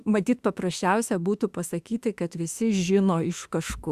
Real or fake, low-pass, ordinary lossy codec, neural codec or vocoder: fake; 14.4 kHz; Opus, 64 kbps; autoencoder, 48 kHz, 32 numbers a frame, DAC-VAE, trained on Japanese speech